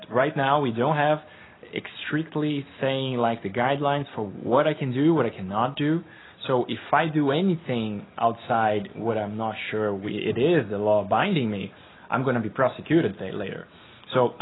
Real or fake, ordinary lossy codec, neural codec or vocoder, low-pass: real; AAC, 16 kbps; none; 7.2 kHz